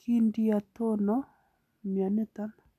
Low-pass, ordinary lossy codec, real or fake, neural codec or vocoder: 14.4 kHz; none; real; none